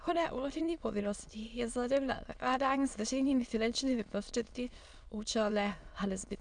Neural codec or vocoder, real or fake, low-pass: autoencoder, 22.05 kHz, a latent of 192 numbers a frame, VITS, trained on many speakers; fake; 9.9 kHz